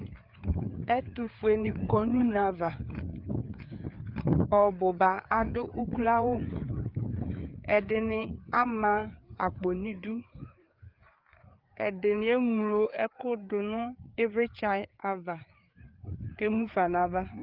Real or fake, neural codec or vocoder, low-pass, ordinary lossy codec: fake; codec, 16 kHz, 4 kbps, FreqCodec, larger model; 5.4 kHz; Opus, 32 kbps